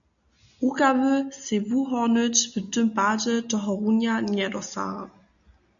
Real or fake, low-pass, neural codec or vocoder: real; 7.2 kHz; none